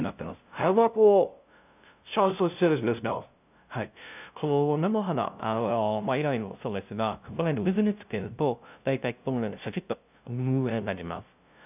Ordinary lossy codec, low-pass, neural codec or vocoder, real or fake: none; 3.6 kHz; codec, 16 kHz, 0.5 kbps, FunCodec, trained on LibriTTS, 25 frames a second; fake